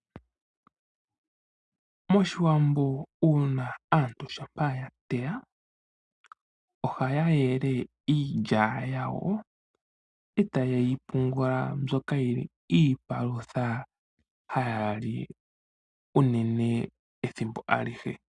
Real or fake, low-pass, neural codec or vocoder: real; 10.8 kHz; none